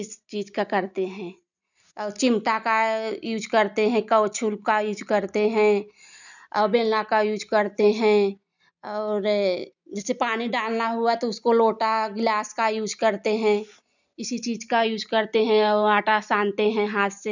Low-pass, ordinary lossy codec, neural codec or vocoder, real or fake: 7.2 kHz; none; none; real